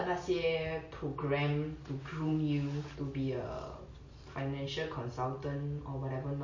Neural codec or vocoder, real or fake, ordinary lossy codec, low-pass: none; real; MP3, 32 kbps; 7.2 kHz